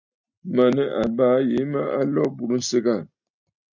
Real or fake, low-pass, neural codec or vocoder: real; 7.2 kHz; none